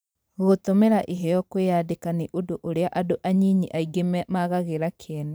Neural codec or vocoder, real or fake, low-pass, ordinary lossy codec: vocoder, 44.1 kHz, 128 mel bands every 512 samples, BigVGAN v2; fake; none; none